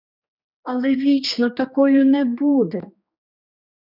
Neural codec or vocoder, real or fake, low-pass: codec, 16 kHz, 2 kbps, X-Codec, HuBERT features, trained on general audio; fake; 5.4 kHz